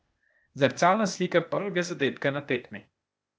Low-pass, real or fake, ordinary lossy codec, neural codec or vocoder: none; fake; none; codec, 16 kHz, 0.8 kbps, ZipCodec